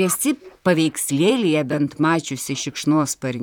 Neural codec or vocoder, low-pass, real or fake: vocoder, 44.1 kHz, 128 mel bands, Pupu-Vocoder; 19.8 kHz; fake